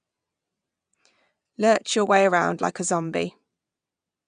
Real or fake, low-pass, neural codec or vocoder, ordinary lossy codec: fake; 9.9 kHz; vocoder, 22.05 kHz, 80 mel bands, WaveNeXt; none